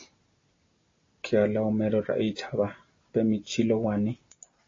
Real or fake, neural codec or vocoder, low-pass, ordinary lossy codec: real; none; 7.2 kHz; AAC, 32 kbps